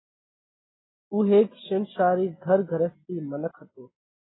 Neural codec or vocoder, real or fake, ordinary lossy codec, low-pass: none; real; AAC, 16 kbps; 7.2 kHz